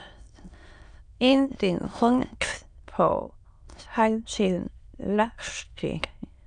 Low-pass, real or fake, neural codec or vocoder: 9.9 kHz; fake; autoencoder, 22.05 kHz, a latent of 192 numbers a frame, VITS, trained on many speakers